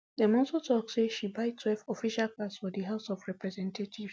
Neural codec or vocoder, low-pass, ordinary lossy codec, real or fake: none; none; none; real